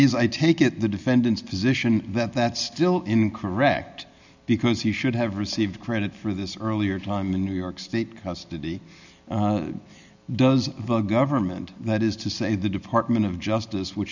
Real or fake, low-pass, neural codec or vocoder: real; 7.2 kHz; none